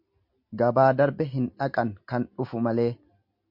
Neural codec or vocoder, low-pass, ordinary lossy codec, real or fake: none; 5.4 kHz; MP3, 48 kbps; real